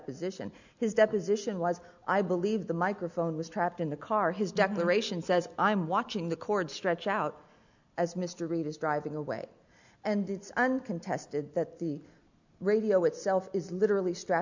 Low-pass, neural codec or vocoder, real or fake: 7.2 kHz; none; real